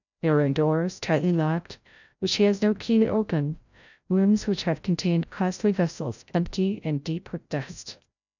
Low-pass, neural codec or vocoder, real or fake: 7.2 kHz; codec, 16 kHz, 0.5 kbps, FreqCodec, larger model; fake